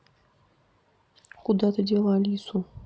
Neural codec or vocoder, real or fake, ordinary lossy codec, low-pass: none; real; none; none